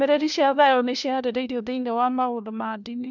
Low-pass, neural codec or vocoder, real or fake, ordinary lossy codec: 7.2 kHz; codec, 16 kHz, 1 kbps, FunCodec, trained on LibriTTS, 50 frames a second; fake; none